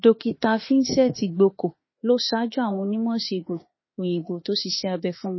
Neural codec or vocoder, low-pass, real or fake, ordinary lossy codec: autoencoder, 48 kHz, 32 numbers a frame, DAC-VAE, trained on Japanese speech; 7.2 kHz; fake; MP3, 24 kbps